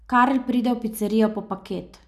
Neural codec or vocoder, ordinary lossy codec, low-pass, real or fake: none; none; 14.4 kHz; real